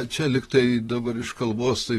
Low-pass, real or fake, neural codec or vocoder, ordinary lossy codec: 19.8 kHz; fake; vocoder, 44.1 kHz, 128 mel bands, Pupu-Vocoder; AAC, 32 kbps